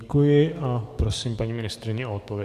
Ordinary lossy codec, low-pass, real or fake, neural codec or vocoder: MP3, 96 kbps; 14.4 kHz; fake; codec, 44.1 kHz, 7.8 kbps, DAC